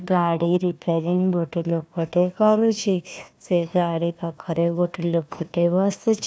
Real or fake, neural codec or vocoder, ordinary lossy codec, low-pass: fake; codec, 16 kHz, 1 kbps, FunCodec, trained on Chinese and English, 50 frames a second; none; none